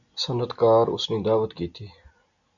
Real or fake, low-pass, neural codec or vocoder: real; 7.2 kHz; none